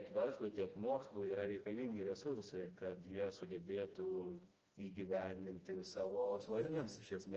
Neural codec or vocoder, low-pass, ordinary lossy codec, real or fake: codec, 16 kHz, 1 kbps, FreqCodec, smaller model; 7.2 kHz; Opus, 24 kbps; fake